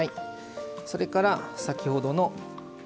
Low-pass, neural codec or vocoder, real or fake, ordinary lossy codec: none; none; real; none